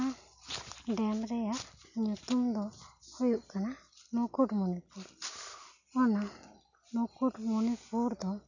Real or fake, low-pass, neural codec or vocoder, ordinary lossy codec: real; 7.2 kHz; none; none